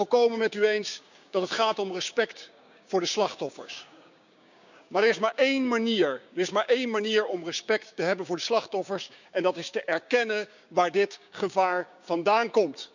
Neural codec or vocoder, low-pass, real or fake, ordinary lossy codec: codec, 16 kHz, 6 kbps, DAC; 7.2 kHz; fake; none